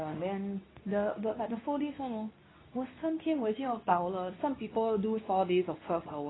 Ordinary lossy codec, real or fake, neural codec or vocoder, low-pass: AAC, 16 kbps; fake; codec, 24 kHz, 0.9 kbps, WavTokenizer, medium speech release version 2; 7.2 kHz